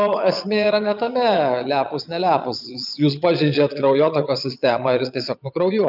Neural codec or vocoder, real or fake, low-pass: vocoder, 22.05 kHz, 80 mel bands, Vocos; fake; 5.4 kHz